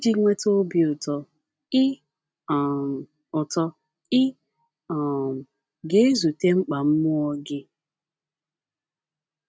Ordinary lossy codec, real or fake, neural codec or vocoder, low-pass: none; real; none; none